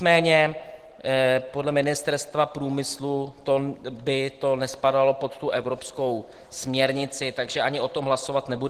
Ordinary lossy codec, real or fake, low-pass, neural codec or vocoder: Opus, 16 kbps; real; 14.4 kHz; none